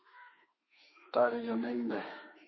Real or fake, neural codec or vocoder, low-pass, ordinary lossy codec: fake; autoencoder, 48 kHz, 32 numbers a frame, DAC-VAE, trained on Japanese speech; 7.2 kHz; MP3, 24 kbps